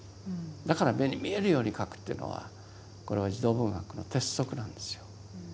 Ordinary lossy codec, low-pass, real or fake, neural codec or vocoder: none; none; real; none